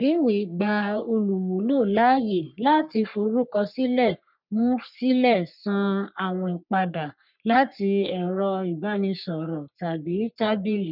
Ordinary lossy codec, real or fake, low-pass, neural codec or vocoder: none; fake; 5.4 kHz; codec, 44.1 kHz, 3.4 kbps, Pupu-Codec